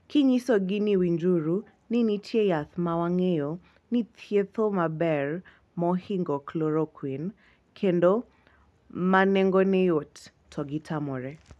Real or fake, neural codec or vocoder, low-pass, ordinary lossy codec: real; none; none; none